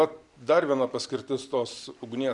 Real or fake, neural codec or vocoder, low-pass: real; none; 10.8 kHz